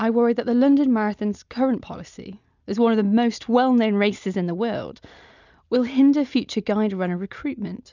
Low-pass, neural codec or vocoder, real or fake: 7.2 kHz; none; real